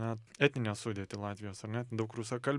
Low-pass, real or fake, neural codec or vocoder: 10.8 kHz; real; none